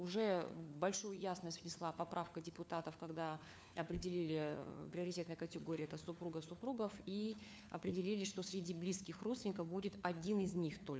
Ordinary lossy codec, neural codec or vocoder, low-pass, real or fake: none; codec, 16 kHz, 4 kbps, FunCodec, trained on LibriTTS, 50 frames a second; none; fake